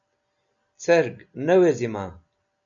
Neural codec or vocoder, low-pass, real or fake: none; 7.2 kHz; real